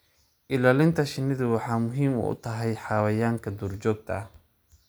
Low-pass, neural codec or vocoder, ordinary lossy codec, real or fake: none; none; none; real